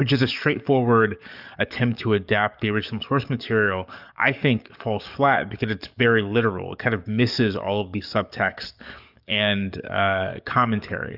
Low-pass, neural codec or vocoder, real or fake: 5.4 kHz; codec, 44.1 kHz, 7.8 kbps, DAC; fake